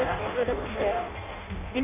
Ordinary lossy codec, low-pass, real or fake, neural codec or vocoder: MP3, 32 kbps; 3.6 kHz; fake; codec, 16 kHz in and 24 kHz out, 0.6 kbps, FireRedTTS-2 codec